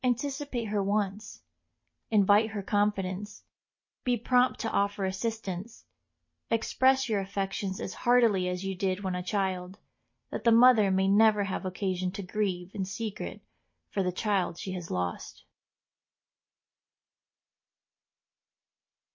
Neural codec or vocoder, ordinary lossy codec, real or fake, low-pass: none; MP3, 32 kbps; real; 7.2 kHz